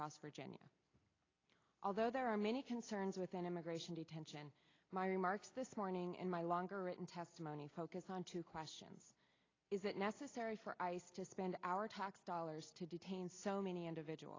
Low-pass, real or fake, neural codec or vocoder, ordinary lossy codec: 7.2 kHz; real; none; AAC, 32 kbps